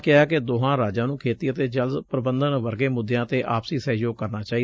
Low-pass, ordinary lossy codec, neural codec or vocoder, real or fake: none; none; none; real